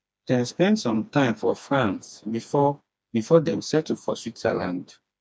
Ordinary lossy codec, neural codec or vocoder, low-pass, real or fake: none; codec, 16 kHz, 2 kbps, FreqCodec, smaller model; none; fake